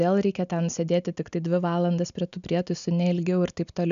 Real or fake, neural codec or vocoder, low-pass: real; none; 7.2 kHz